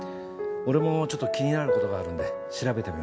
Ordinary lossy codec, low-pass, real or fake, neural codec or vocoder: none; none; real; none